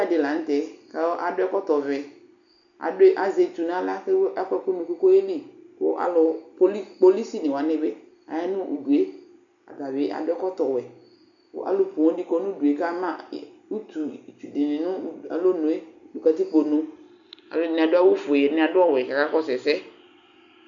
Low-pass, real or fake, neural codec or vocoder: 7.2 kHz; real; none